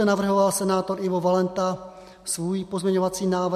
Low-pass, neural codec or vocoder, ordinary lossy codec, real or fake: 14.4 kHz; none; MP3, 64 kbps; real